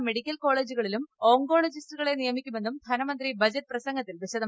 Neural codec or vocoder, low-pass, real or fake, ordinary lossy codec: none; none; real; none